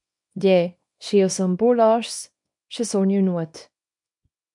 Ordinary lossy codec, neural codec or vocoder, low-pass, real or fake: MP3, 64 kbps; codec, 24 kHz, 0.9 kbps, WavTokenizer, small release; 10.8 kHz; fake